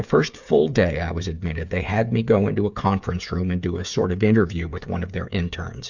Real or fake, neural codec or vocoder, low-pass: fake; codec, 16 kHz, 8 kbps, FreqCodec, smaller model; 7.2 kHz